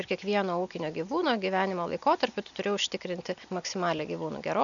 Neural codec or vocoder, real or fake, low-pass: none; real; 7.2 kHz